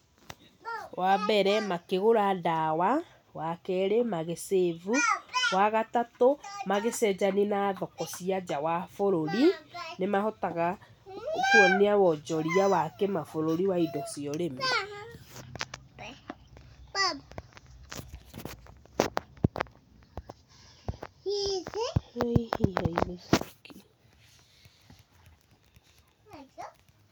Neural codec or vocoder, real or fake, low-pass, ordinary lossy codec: none; real; none; none